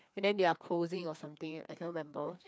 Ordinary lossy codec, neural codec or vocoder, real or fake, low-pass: none; codec, 16 kHz, 2 kbps, FreqCodec, larger model; fake; none